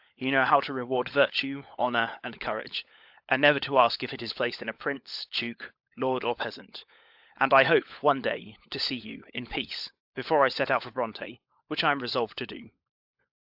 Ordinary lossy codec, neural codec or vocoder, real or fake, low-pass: AAC, 48 kbps; codec, 16 kHz, 16 kbps, FunCodec, trained on LibriTTS, 50 frames a second; fake; 5.4 kHz